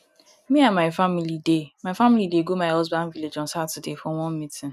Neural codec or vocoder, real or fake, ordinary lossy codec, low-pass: none; real; none; 14.4 kHz